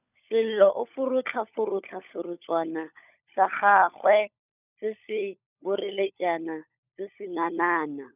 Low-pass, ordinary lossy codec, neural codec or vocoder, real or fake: 3.6 kHz; none; codec, 16 kHz, 16 kbps, FunCodec, trained on LibriTTS, 50 frames a second; fake